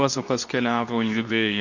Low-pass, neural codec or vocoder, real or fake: 7.2 kHz; codec, 24 kHz, 0.9 kbps, WavTokenizer, medium speech release version 2; fake